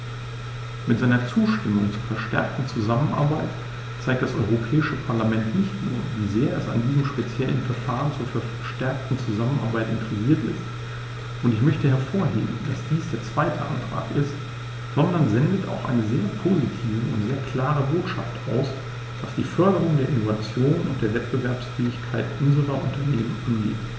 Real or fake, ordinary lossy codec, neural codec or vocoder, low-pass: real; none; none; none